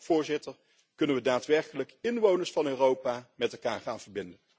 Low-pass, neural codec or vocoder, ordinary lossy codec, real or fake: none; none; none; real